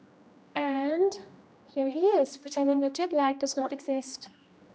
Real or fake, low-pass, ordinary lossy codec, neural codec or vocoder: fake; none; none; codec, 16 kHz, 1 kbps, X-Codec, HuBERT features, trained on general audio